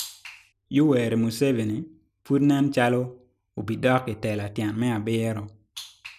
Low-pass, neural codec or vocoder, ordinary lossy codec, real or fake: 14.4 kHz; none; none; real